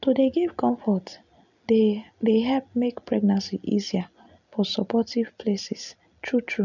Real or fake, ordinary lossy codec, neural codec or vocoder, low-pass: real; none; none; 7.2 kHz